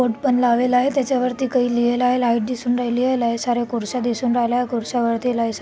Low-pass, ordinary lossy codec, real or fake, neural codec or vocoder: none; none; real; none